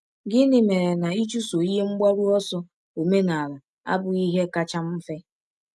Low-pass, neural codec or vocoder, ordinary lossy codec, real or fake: none; none; none; real